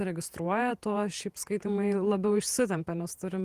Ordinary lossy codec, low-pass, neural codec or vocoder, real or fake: Opus, 24 kbps; 14.4 kHz; vocoder, 48 kHz, 128 mel bands, Vocos; fake